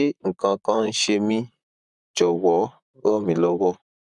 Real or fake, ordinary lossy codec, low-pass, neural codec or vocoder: fake; none; 10.8 kHz; vocoder, 44.1 kHz, 128 mel bands, Pupu-Vocoder